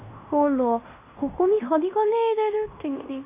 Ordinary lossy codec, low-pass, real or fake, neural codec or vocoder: AAC, 24 kbps; 3.6 kHz; fake; codec, 16 kHz in and 24 kHz out, 0.9 kbps, LongCat-Audio-Codec, fine tuned four codebook decoder